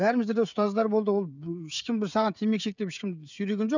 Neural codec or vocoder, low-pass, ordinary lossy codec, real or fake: codec, 16 kHz, 16 kbps, FreqCodec, smaller model; 7.2 kHz; none; fake